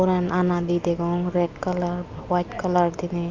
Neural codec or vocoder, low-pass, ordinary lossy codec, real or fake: none; 7.2 kHz; Opus, 24 kbps; real